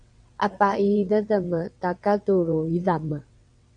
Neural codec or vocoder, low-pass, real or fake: vocoder, 22.05 kHz, 80 mel bands, WaveNeXt; 9.9 kHz; fake